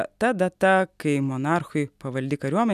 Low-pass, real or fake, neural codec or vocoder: 14.4 kHz; real; none